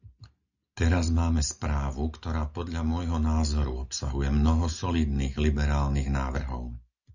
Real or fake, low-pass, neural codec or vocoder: real; 7.2 kHz; none